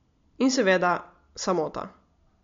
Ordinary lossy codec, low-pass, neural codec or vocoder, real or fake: MP3, 48 kbps; 7.2 kHz; none; real